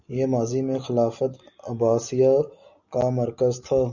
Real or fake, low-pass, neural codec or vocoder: real; 7.2 kHz; none